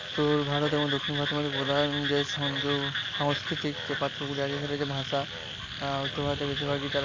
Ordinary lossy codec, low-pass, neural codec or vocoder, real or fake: AAC, 32 kbps; 7.2 kHz; none; real